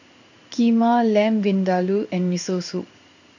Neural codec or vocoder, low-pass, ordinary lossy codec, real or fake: codec, 16 kHz in and 24 kHz out, 1 kbps, XY-Tokenizer; 7.2 kHz; none; fake